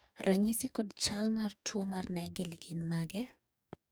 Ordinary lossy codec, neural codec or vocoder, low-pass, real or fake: none; codec, 44.1 kHz, 2.6 kbps, DAC; none; fake